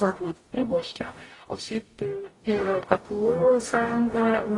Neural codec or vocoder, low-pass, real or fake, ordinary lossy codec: codec, 44.1 kHz, 0.9 kbps, DAC; 10.8 kHz; fake; AAC, 32 kbps